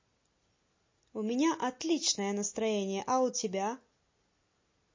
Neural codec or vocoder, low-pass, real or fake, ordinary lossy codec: none; 7.2 kHz; real; MP3, 32 kbps